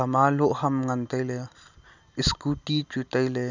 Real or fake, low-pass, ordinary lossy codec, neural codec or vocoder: real; 7.2 kHz; none; none